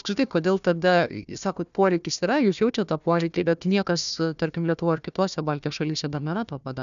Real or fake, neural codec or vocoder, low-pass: fake; codec, 16 kHz, 1 kbps, FunCodec, trained on Chinese and English, 50 frames a second; 7.2 kHz